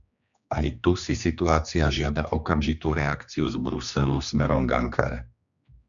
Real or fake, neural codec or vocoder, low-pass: fake; codec, 16 kHz, 2 kbps, X-Codec, HuBERT features, trained on general audio; 7.2 kHz